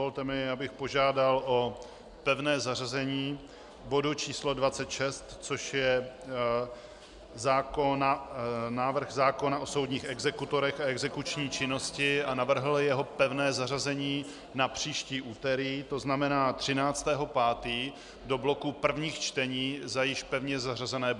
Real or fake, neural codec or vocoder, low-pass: real; none; 10.8 kHz